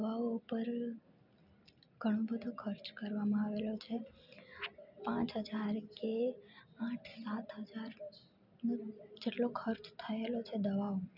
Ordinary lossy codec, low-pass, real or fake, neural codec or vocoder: none; 5.4 kHz; real; none